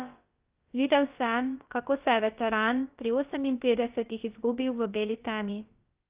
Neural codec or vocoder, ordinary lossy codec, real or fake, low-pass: codec, 16 kHz, about 1 kbps, DyCAST, with the encoder's durations; Opus, 24 kbps; fake; 3.6 kHz